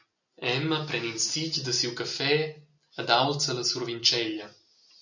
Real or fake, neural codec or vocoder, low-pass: real; none; 7.2 kHz